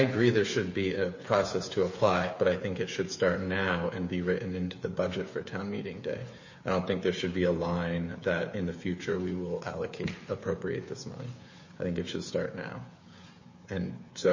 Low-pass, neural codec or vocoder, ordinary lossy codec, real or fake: 7.2 kHz; codec, 16 kHz, 8 kbps, FreqCodec, smaller model; MP3, 32 kbps; fake